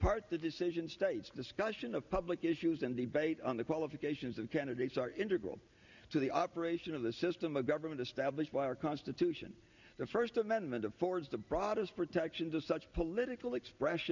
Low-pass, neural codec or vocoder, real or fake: 7.2 kHz; none; real